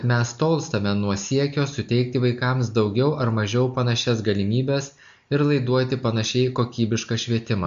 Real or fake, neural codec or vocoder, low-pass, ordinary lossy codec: real; none; 7.2 kHz; MP3, 64 kbps